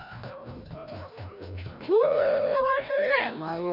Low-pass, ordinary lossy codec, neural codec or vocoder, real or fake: 5.4 kHz; Opus, 64 kbps; codec, 16 kHz, 1 kbps, FreqCodec, larger model; fake